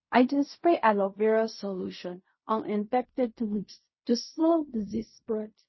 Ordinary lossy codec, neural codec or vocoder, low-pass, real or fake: MP3, 24 kbps; codec, 16 kHz in and 24 kHz out, 0.4 kbps, LongCat-Audio-Codec, fine tuned four codebook decoder; 7.2 kHz; fake